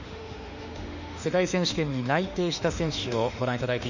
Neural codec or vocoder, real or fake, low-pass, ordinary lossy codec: autoencoder, 48 kHz, 32 numbers a frame, DAC-VAE, trained on Japanese speech; fake; 7.2 kHz; none